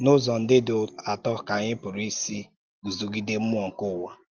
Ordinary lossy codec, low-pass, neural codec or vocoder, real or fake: Opus, 24 kbps; 7.2 kHz; none; real